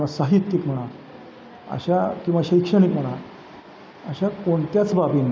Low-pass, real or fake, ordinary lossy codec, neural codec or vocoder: none; real; none; none